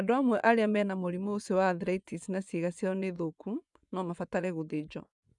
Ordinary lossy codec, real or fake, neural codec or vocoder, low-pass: none; fake; vocoder, 24 kHz, 100 mel bands, Vocos; 10.8 kHz